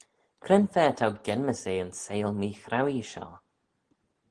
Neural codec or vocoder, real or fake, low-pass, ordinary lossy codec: none; real; 9.9 kHz; Opus, 16 kbps